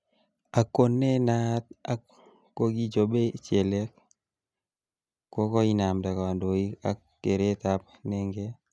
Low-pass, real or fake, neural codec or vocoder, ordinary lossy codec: none; real; none; none